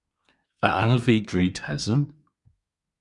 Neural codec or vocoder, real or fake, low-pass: codec, 24 kHz, 1 kbps, SNAC; fake; 10.8 kHz